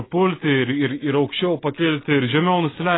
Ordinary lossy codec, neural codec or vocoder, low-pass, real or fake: AAC, 16 kbps; none; 7.2 kHz; real